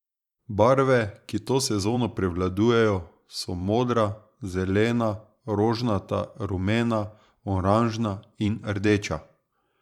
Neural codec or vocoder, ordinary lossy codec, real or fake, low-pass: vocoder, 44.1 kHz, 128 mel bands every 512 samples, BigVGAN v2; none; fake; 19.8 kHz